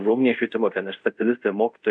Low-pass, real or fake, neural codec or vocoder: 9.9 kHz; fake; codec, 24 kHz, 0.5 kbps, DualCodec